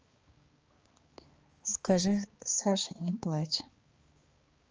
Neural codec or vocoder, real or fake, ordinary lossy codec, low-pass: codec, 16 kHz, 2 kbps, X-Codec, HuBERT features, trained on balanced general audio; fake; Opus, 24 kbps; 7.2 kHz